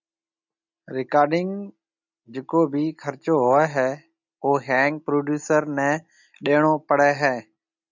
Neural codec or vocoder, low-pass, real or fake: none; 7.2 kHz; real